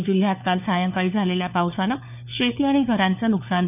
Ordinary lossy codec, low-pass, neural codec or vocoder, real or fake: none; 3.6 kHz; codec, 16 kHz, 4 kbps, FunCodec, trained on LibriTTS, 50 frames a second; fake